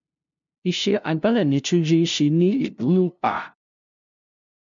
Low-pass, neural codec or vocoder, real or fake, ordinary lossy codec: 7.2 kHz; codec, 16 kHz, 0.5 kbps, FunCodec, trained on LibriTTS, 25 frames a second; fake; MP3, 64 kbps